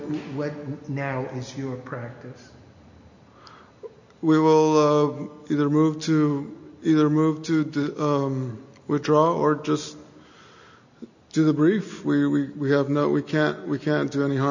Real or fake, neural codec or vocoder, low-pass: real; none; 7.2 kHz